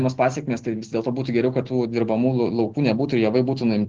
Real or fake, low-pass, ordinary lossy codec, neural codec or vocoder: real; 7.2 kHz; Opus, 16 kbps; none